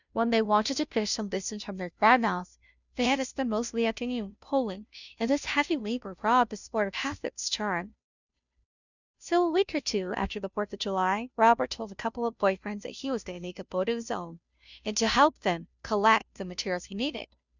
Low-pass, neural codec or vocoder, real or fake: 7.2 kHz; codec, 16 kHz, 0.5 kbps, FunCodec, trained on Chinese and English, 25 frames a second; fake